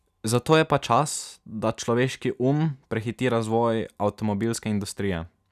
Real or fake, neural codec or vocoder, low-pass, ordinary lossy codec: real; none; 14.4 kHz; none